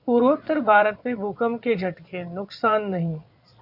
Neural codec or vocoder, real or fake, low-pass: vocoder, 22.05 kHz, 80 mel bands, Vocos; fake; 5.4 kHz